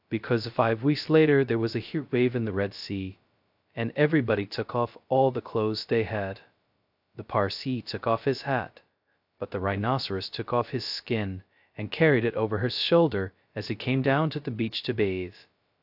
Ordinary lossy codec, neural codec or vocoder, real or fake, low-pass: AAC, 48 kbps; codec, 16 kHz, 0.2 kbps, FocalCodec; fake; 5.4 kHz